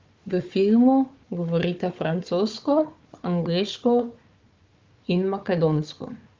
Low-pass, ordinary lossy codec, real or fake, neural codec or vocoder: 7.2 kHz; Opus, 32 kbps; fake; codec, 16 kHz, 4 kbps, FunCodec, trained on Chinese and English, 50 frames a second